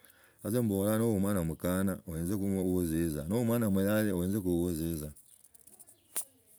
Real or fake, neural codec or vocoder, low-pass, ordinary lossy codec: real; none; none; none